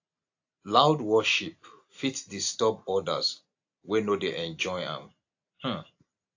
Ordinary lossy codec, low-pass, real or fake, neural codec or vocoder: AAC, 48 kbps; 7.2 kHz; real; none